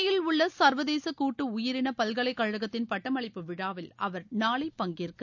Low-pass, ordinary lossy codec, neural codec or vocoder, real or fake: 7.2 kHz; none; none; real